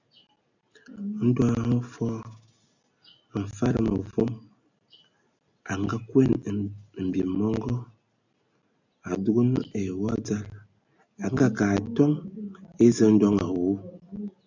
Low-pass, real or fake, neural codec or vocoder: 7.2 kHz; real; none